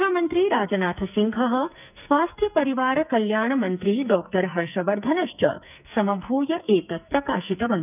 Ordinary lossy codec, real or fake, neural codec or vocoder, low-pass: none; fake; codec, 44.1 kHz, 2.6 kbps, SNAC; 3.6 kHz